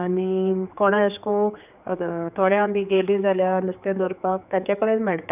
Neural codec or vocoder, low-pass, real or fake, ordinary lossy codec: codec, 16 kHz, 4 kbps, X-Codec, HuBERT features, trained on general audio; 3.6 kHz; fake; none